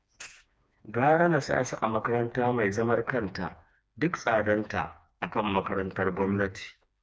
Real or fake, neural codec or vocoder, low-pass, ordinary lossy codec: fake; codec, 16 kHz, 2 kbps, FreqCodec, smaller model; none; none